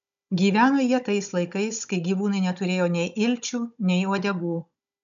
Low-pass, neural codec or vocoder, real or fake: 7.2 kHz; codec, 16 kHz, 16 kbps, FunCodec, trained on Chinese and English, 50 frames a second; fake